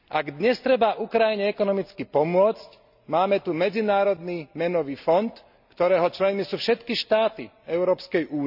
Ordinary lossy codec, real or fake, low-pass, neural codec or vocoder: none; real; 5.4 kHz; none